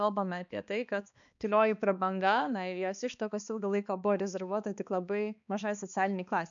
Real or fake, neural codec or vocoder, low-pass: fake; codec, 16 kHz, 2 kbps, X-Codec, HuBERT features, trained on balanced general audio; 7.2 kHz